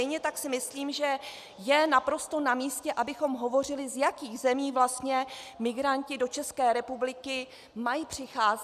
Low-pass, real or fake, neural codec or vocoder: 14.4 kHz; real; none